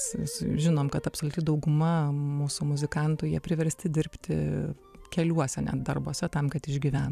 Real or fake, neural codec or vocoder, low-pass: fake; vocoder, 44.1 kHz, 128 mel bands every 256 samples, BigVGAN v2; 14.4 kHz